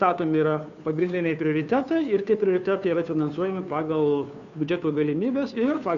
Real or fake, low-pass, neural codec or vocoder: fake; 7.2 kHz; codec, 16 kHz, 2 kbps, FunCodec, trained on Chinese and English, 25 frames a second